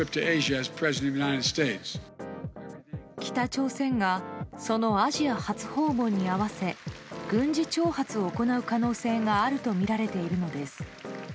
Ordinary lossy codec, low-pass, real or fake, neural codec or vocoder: none; none; real; none